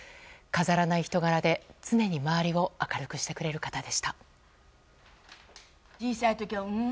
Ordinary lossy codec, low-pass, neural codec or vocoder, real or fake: none; none; none; real